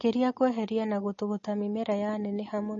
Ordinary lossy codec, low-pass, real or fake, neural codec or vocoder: MP3, 32 kbps; 7.2 kHz; real; none